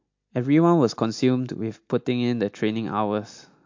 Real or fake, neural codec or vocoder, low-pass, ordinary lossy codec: real; none; 7.2 kHz; MP3, 48 kbps